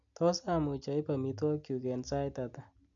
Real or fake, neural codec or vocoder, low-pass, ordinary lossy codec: real; none; 7.2 kHz; MP3, 64 kbps